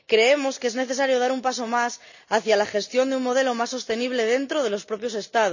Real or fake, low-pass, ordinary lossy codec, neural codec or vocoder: real; 7.2 kHz; none; none